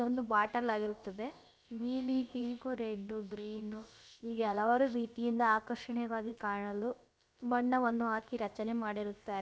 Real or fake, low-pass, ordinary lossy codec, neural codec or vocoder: fake; none; none; codec, 16 kHz, about 1 kbps, DyCAST, with the encoder's durations